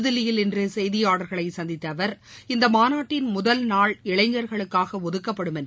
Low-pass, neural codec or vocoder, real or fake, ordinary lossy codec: none; none; real; none